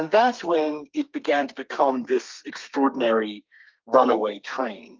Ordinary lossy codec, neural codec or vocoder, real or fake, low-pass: Opus, 24 kbps; codec, 32 kHz, 1.9 kbps, SNAC; fake; 7.2 kHz